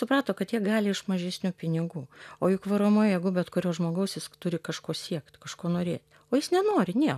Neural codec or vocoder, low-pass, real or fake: none; 14.4 kHz; real